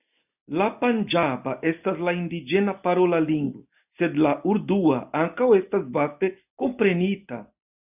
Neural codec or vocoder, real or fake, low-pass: none; real; 3.6 kHz